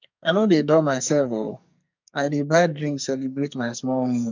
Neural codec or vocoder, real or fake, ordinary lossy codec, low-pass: codec, 44.1 kHz, 2.6 kbps, SNAC; fake; MP3, 64 kbps; 7.2 kHz